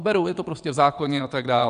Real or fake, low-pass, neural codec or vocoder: fake; 9.9 kHz; vocoder, 22.05 kHz, 80 mel bands, WaveNeXt